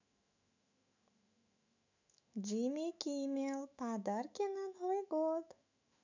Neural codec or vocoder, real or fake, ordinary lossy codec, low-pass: autoencoder, 48 kHz, 128 numbers a frame, DAC-VAE, trained on Japanese speech; fake; none; 7.2 kHz